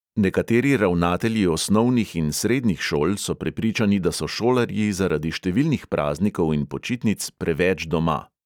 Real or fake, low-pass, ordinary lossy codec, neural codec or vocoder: real; 19.8 kHz; none; none